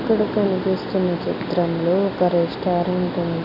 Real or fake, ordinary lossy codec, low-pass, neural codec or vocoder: real; none; 5.4 kHz; none